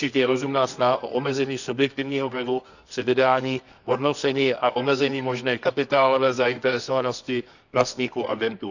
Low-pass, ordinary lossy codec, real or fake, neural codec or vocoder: 7.2 kHz; none; fake; codec, 24 kHz, 0.9 kbps, WavTokenizer, medium music audio release